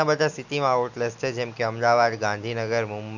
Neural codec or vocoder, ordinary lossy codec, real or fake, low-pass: none; none; real; 7.2 kHz